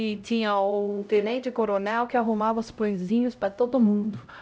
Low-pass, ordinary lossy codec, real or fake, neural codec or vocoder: none; none; fake; codec, 16 kHz, 0.5 kbps, X-Codec, HuBERT features, trained on LibriSpeech